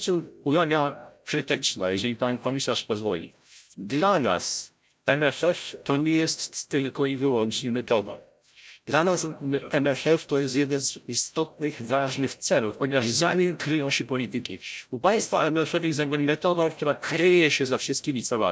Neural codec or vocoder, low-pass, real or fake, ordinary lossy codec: codec, 16 kHz, 0.5 kbps, FreqCodec, larger model; none; fake; none